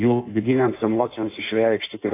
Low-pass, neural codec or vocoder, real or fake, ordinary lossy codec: 3.6 kHz; codec, 16 kHz in and 24 kHz out, 1.1 kbps, FireRedTTS-2 codec; fake; AAC, 24 kbps